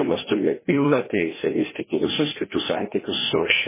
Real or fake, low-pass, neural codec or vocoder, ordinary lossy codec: fake; 3.6 kHz; codec, 16 kHz, 1 kbps, FreqCodec, larger model; MP3, 16 kbps